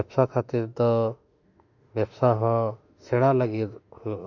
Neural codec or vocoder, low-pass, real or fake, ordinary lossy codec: autoencoder, 48 kHz, 32 numbers a frame, DAC-VAE, trained on Japanese speech; 7.2 kHz; fake; none